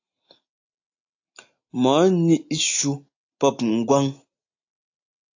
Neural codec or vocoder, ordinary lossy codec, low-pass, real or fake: none; AAC, 48 kbps; 7.2 kHz; real